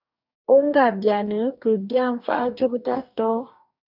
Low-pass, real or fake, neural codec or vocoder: 5.4 kHz; fake; codec, 44.1 kHz, 2.6 kbps, DAC